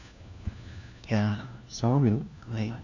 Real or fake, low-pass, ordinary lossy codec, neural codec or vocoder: fake; 7.2 kHz; none; codec, 16 kHz, 1 kbps, FunCodec, trained on LibriTTS, 50 frames a second